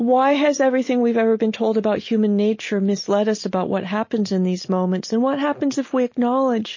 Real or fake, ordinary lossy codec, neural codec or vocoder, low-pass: real; MP3, 32 kbps; none; 7.2 kHz